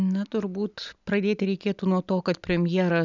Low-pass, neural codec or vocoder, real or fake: 7.2 kHz; none; real